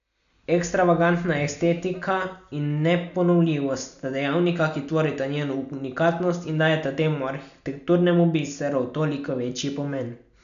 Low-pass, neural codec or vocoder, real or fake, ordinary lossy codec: 7.2 kHz; none; real; none